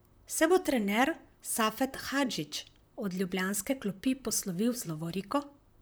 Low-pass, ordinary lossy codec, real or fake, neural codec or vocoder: none; none; fake; vocoder, 44.1 kHz, 128 mel bands, Pupu-Vocoder